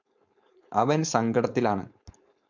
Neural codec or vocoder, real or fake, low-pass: codec, 16 kHz, 4.8 kbps, FACodec; fake; 7.2 kHz